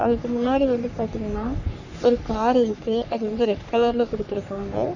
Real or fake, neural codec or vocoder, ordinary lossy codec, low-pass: fake; codec, 44.1 kHz, 3.4 kbps, Pupu-Codec; none; 7.2 kHz